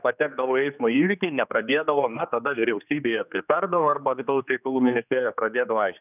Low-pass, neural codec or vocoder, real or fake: 3.6 kHz; codec, 16 kHz, 2 kbps, X-Codec, HuBERT features, trained on general audio; fake